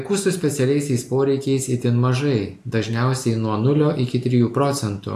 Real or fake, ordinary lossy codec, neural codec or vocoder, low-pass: real; AAC, 64 kbps; none; 14.4 kHz